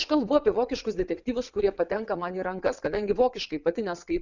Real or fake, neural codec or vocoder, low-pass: fake; vocoder, 22.05 kHz, 80 mel bands, WaveNeXt; 7.2 kHz